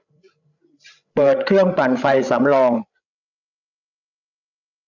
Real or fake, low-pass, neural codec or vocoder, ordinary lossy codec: fake; 7.2 kHz; codec, 16 kHz, 16 kbps, FreqCodec, larger model; none